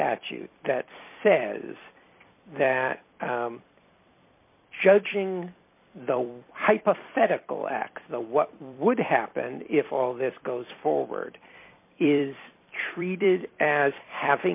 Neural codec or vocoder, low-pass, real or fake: vocoder, 44.1 kHz, 128 mel bands every 256 samples, BigVGAN v2; 3.6 kHz; fake